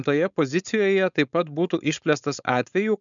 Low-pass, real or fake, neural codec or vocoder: 7.2 kHz; fake; codec, 16 kHz, 4.8 kbps, FACodec